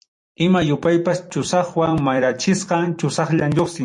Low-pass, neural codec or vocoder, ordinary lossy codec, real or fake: 10.8 kHz; vocoder, 44.1 kHz, 128 mel bands every 256 samples, BigVGAN v2; MP3, 48 kbps; fake